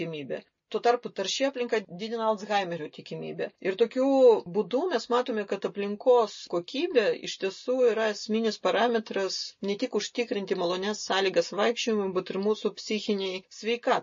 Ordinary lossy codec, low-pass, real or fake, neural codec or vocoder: MP3, 32 kbps; 7.2 kHz; real; none